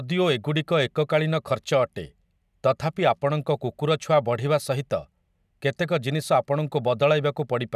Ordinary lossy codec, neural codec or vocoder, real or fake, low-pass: none; none; real; 14.4 kHz